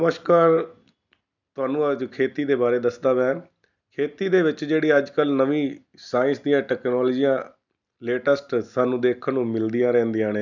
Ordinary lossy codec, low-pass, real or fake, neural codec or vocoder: none; 7.2 kHz; real; none